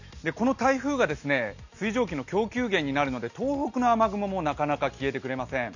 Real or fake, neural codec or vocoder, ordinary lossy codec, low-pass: real; none; none; 7.2 kHz